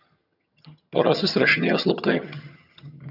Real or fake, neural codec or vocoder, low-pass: fake; vocoder, 22.05 kHz, 80 mel bands, HiFi-GAN; 5.4 kHz